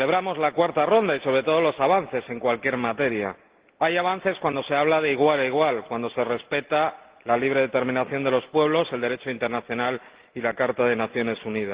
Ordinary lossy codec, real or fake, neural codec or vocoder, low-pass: Opus, 16 kbps; real; none; 3.6 kHz